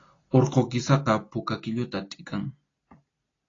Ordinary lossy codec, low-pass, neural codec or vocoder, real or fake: AAC, 32 kbps; 7.2 kHz; none; real